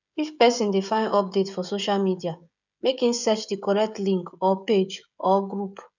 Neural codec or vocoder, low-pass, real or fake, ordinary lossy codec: codec, 16 kHz, 16 kbps, FreqCodec, smaller model; 7.2 kHz; fake; none